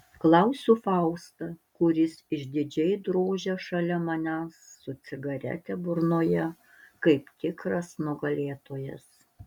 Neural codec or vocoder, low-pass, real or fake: none; 19.8 kHz; real